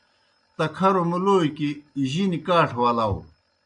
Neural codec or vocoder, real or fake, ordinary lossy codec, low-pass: none; real; MP3, 96 kbps; 9.9 kHz